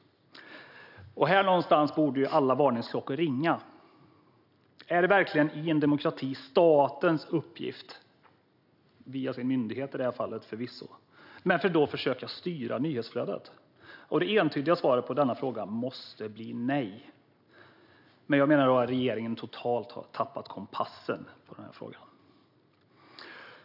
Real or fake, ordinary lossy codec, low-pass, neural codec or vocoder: real; none; 5.4 kHz; none